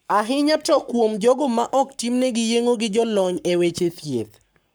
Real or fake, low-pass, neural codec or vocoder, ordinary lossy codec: fake; none; codec, 44.1 kHz, 7.8 kbps, Pupu-Codec; none